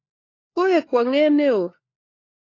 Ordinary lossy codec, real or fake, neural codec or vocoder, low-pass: AAC, 32 kbps; fake; codec, 16 kHz, 4 kbps, FunCodec, trained on LibriTTS, 50 frames a second; 7.2 kHz